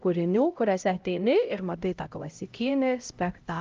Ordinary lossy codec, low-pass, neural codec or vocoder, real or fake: Opus, 24 kbps; 7.2 kHz; codec, 16 kHz, 0.5 kbps, X-Codec, HuBERT features, trained on LibriSpeech; fake